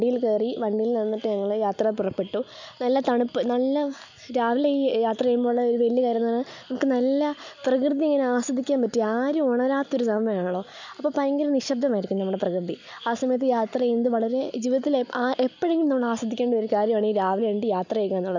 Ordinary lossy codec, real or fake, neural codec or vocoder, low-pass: none; real; none; 7.2 kHz